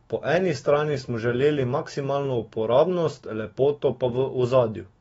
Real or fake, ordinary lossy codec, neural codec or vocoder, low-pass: fake; AAC, 24 kbps; autoencoder, 48 kHz, 128 numbers a frame, DAC-VAE, trained on Japanese speech; 19.8 kHz